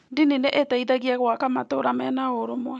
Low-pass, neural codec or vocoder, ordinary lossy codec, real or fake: none; none; none; real